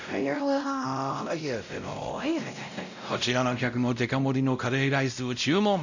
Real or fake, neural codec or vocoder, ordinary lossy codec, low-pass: fake; codec, 16 kHz, 0.5 kbps, X-Codec, WavLM features, trained on Multilingual LibriSpeech; none; 7.2 kHz